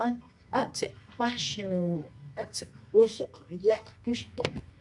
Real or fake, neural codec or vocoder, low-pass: fake; codec, 24 kHz, 0.9 kbps, WavTokenizer, medium music audio release; 10.8 kHz